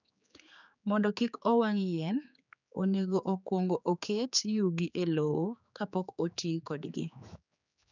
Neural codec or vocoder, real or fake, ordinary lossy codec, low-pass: codec, 16 kHz, 4 kbps, X-Codec, HuBERT features, trained on general audio; fake; none; 7.2 kHz